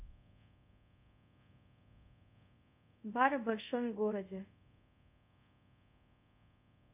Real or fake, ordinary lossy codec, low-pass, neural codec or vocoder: fake; none; 3.6 kHz; codec, 24 kHz, 0.5 kbps, DualCodec